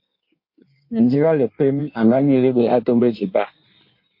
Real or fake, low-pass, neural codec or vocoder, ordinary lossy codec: fake; 5.4 kHz; codec, 16 kHz in and 24 kHz out, 1.1 kbps, FireRedTTS-2 codec; MP3, 32 kbps